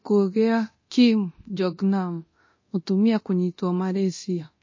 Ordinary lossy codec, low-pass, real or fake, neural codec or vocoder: MP3, 32 kbps; 7.2 kHz; fake; codec, 24 kHz, 0.9 kbps, DualCodec